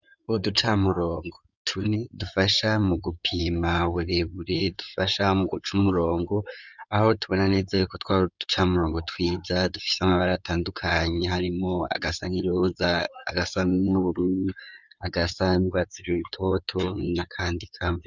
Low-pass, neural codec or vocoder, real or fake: 7.2 kHz; codec, 16 kHz in and 24 kHz out, 2.2 kbps, FireRedTTS-2 codec; fake